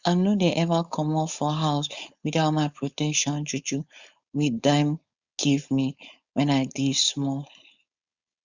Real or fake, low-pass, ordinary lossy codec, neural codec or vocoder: fake; 7.2 kHz; Opus, 64 kbps; codec, 16 kHz, 4.8 kbps, FACodec